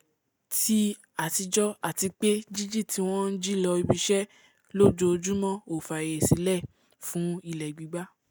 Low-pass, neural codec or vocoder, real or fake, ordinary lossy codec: none; none; real; none